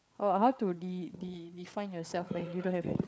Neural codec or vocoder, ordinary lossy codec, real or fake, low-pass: codec, 16 kHz, 8 kbps, FreqCodec, larger model; none; fake; none